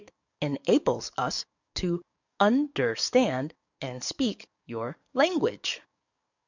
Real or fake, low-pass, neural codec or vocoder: fake; 7.2 kHz; codec, 44.1 kHz, 7.8 kbps, DAC